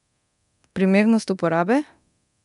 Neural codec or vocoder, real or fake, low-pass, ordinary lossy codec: codec, 24 kHz, 0.9 kbps, DualCodec; fake; 10.8 kHz; none